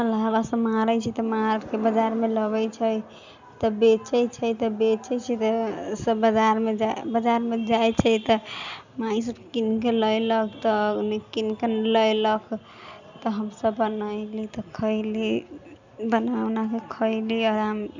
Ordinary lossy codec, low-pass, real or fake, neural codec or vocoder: none; 7.2 kHz; real; none